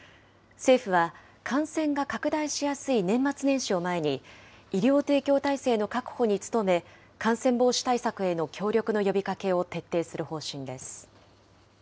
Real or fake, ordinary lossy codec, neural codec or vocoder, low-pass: real; none; none; none